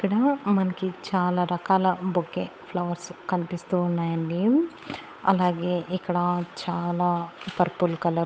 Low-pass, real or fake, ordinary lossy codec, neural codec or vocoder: none; fake; none; codec, 16 kHz, 8 kbps, FunCodec, trained on Chinese and English, 25 frames a second